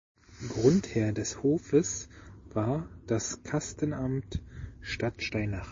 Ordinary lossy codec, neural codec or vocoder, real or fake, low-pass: MP3, 32 kbps; none; real; 7.2 kHz